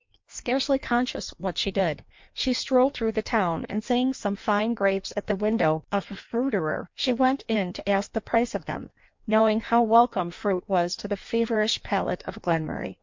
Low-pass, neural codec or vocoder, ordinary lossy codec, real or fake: 7.2 kHz; codec, 16 kHz in and 24 kHz out, 1.1 kbps, FireRedTTS-2 codec; MP3, 48 kbps; fake